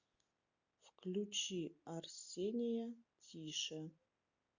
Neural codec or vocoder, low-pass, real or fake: none; 7.2 kHz; real